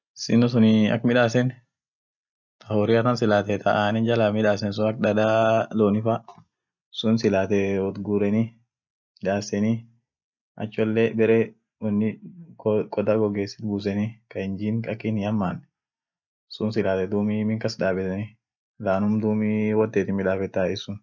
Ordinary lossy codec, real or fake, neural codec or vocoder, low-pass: none; real; none; 7.2 kHz